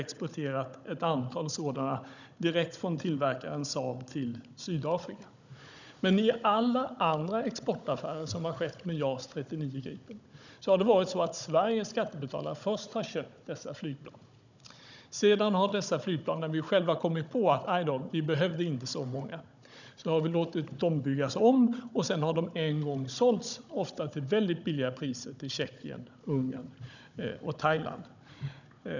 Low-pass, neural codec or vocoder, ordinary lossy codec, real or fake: 7.2 kHz; codec, 16 kHz, 16 kbps, FunCodec, trained on Chinese and English, 50 frames a second; none; fake